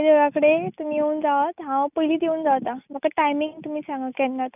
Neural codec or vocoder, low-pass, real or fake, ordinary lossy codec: none; 3.6 kHz; real; none